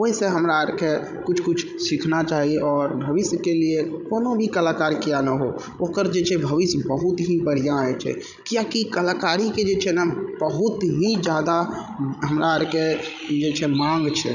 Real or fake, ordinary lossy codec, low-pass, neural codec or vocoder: fake; none; 7.2 kHz; codec, 16 kHz, 16 kbps, FreqCodec, larger model